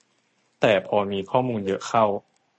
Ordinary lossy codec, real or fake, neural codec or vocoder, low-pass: MP3, 32 kbps; real; none; 10.8 kHz